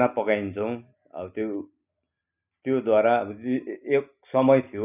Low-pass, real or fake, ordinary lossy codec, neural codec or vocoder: 3.6 kHz; real; none; none